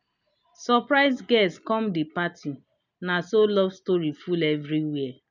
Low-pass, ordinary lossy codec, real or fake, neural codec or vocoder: 7.2 kHz; none; real; none